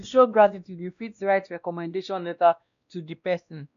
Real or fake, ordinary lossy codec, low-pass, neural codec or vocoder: fake; none; 7.2 kHz; codec, 16 kHz, 1 kbps, X-Codec, WavLM features, trained on Multilingual LibriSpeech